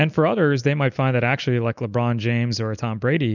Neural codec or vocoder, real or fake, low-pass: none; real; 7.2 kHz